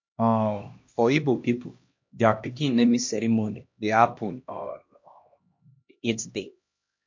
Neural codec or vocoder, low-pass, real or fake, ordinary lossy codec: codec, 16 kHz, 1 kbps, X-Codec, HuBERT features, trained on LibriSpeech; 7.2 kHz; fake; MP3, 48 kbps